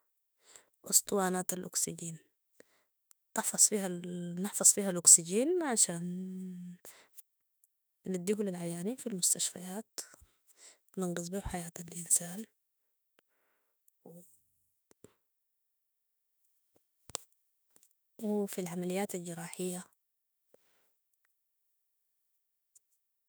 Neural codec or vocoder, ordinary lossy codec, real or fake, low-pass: autoencoder, 48 kHz, 32 numbers a frame, DAC-VAE, trained on Japanese speech; none; fake; none